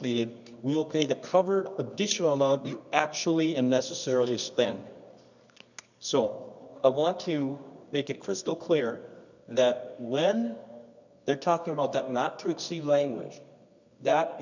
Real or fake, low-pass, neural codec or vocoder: fake; 7.2 kHz; codec, 24 kHz, 0.9 kbps, WavTokenizer, medium music audio release